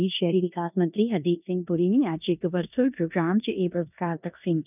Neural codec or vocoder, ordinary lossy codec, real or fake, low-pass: codec, 16 kHz in and 24 kHz out, 0.9 kbps, LongCat-Audio-Codec, four codebook decoder; none; fake; 3.6 kHz